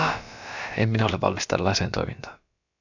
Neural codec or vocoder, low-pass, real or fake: codec, 16 kHz, about 1 kbps, DyCAST, with the encoder's durations; 7.2 kHz; fake